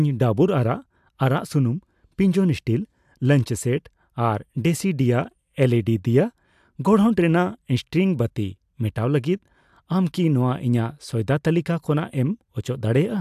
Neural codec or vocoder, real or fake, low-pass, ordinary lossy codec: none; real; 14.4 kHz; AAC, 96 kbps